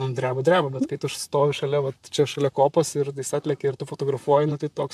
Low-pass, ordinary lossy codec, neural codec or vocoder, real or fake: 14.4 kHz; MP3, 96 kbps; vocoder, 44.1 kHz, 128 mel bands, Pupu-Vocoder; fake